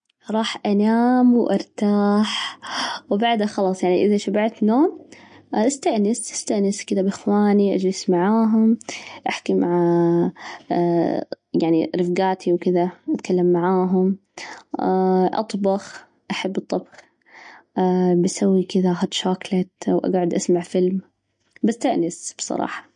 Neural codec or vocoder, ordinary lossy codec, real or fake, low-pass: none; MP3, 48 kbps; real; 9.9 kHz